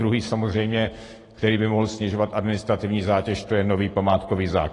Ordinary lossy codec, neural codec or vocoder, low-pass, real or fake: AAC, 32 kbps; none; 10.8 kHz; real